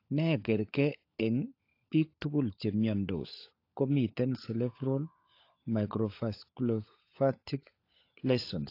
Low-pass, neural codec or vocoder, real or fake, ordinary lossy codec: 5.4 kHz; codec, 16 kHz, 16 kbps, FunCodec, trained on LibriTTS, 50 frames a second; fake; AAC, 32 kbps